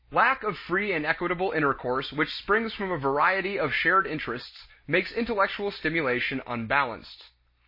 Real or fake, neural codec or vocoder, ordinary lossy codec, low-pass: real; none; MP3, 24 kbps; 5.4 kHz